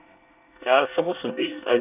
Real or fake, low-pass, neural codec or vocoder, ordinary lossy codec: fake; 3.6 kHz; codec, 24 kHz, 1 kbps, SNAC; none